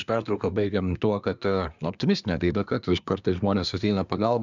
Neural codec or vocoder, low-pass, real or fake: codec, 24 kHz, 1 kbps, SNAC; 7.2 kHz; fake